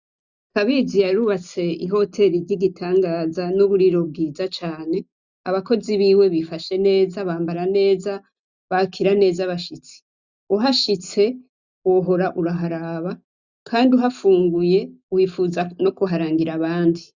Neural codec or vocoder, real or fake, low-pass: none; real; 7.2 kHz